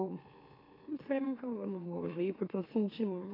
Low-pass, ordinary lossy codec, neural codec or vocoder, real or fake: 5.4 kHz; AAC, 24 kbps; autoencoder, 44.1 kHz, a latent of 192 numbers a frame, MeloTTS; fake